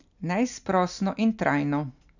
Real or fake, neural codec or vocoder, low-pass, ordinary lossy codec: real; none; 7.2 kHz; none